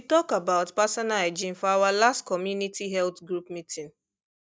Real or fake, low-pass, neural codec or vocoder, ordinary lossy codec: real; none; none; none